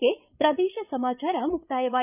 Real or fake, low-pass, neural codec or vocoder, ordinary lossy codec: fake; 3.6 kHz; codec, 16 kHz, 8 kbps, FreqCodec, larger model; none